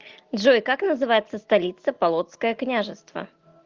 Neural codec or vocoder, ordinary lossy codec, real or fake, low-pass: none; Opus, 32 kbps; real; 7.2 kHz